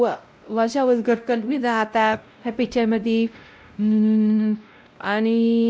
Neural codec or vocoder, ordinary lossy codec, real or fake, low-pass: codec, 16 kHz, 0.5 kbps, X-Codec, WavLM features, trained on Multilingual LibriSpeech; none; fake; none